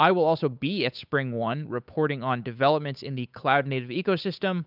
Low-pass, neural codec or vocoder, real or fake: 5.4 kHz; codec, 16 kHz, 8 kbps, FunCodec, trained on Chinese and English, 25 frames a second; fake